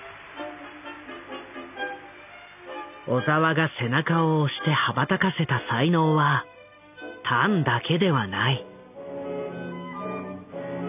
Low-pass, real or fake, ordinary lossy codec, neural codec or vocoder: 3.6 kHz; real; none; none